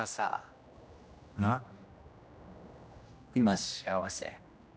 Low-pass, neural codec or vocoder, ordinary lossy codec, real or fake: none; codec, 16 kHz, 1 kbps, X-Codec, HuBERT features, trained on general audio; none; fake